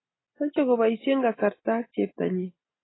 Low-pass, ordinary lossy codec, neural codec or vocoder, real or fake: 7.2 kHz; AAC, 16 kbps; none; real